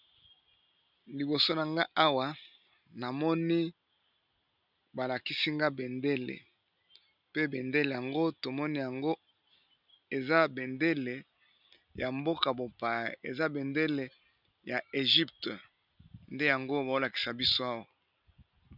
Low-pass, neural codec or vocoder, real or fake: 5.4 kHz; none; real